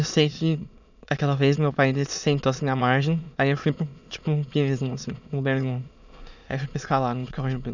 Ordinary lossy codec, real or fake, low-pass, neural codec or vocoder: none; fake; 7.2 kHz; autoencoder, 22.05 kHz, a latent of 192 numbers a frame, VITS, trained on many speakers